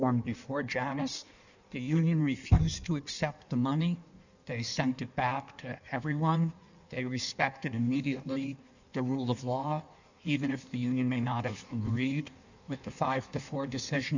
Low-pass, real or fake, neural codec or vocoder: 7.2 kHz; fake; codec, 16 kHz in and 24 kHz out, 1.1 kbps, FireRedTTS-2 codec